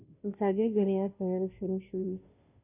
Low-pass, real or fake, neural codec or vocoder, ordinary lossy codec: 3.6 kHz; fake; codec, 16 kHz, 0.5 kbps, FunCodec, trained on Chinese and English, 25 frames a second; none